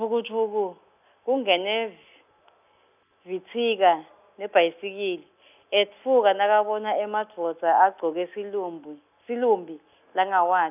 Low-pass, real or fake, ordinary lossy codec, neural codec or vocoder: 3.6 kHz; real; none; none